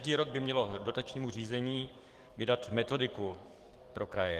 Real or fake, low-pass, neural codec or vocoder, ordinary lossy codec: fake; 14.4 kHz; codec, 44.1 kHz, 7.8 kbps, DAC; Opus, 24 kbps